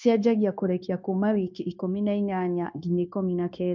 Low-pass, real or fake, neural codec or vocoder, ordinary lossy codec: 7.2 kHz; fake; codec, 16 kHz, 0.9 kbps, LongCat-Audio-Codec; MP3, 64 kbps